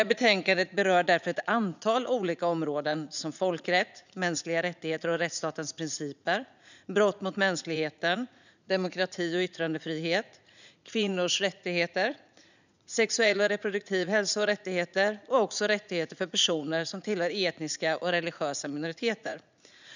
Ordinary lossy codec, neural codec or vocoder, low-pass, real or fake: none; vocoder, 44.1 kHz, 80 mel bands, Vocos; 7.2 kHz; fake